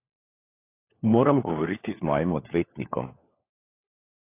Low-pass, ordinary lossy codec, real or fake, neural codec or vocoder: 3.6 kHz; AAC, 24 kbps; fake; codec, 16 kHz, 4 kbps, FunCodec, trained on LibriTTS, 50 frames a second